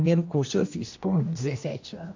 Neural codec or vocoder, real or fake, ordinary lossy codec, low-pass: codec, 16 kHz, 1 kbps, X-Codec, HuBERT features, trained on general audio; fake; AAC, 48 kbps; 7.2 kHz